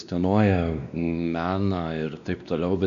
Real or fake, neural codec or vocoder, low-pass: fake; codec, 16 kHz, 2 kbps, X-Codec, WavLM features, trained on Multilingual LibriSpeech; 7.2 kHz